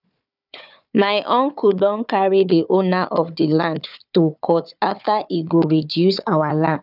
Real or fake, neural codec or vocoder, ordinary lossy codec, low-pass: fake; codec, 16 kHz, 4 kbps, FunCodec, trained on Chinese and English, 50 frames a second; none; 5.4 kHz